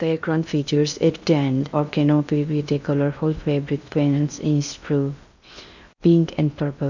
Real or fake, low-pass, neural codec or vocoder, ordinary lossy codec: fake; 7.2 kHz; codec, 16 kHz in and 24 kHz out, 0.6 kbps, FocalCodec, streaming, 2048 codes; none